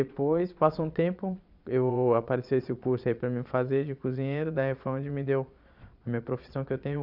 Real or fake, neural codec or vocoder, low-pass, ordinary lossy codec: fake; vocoder, 22.05 kHz, 80 mel bands, Vocos; 5.4 kHz; none